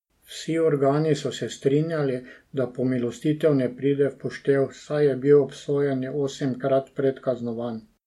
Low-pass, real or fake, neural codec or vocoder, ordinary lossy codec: 19.8 kHz; real; none; MP3, 64 kbps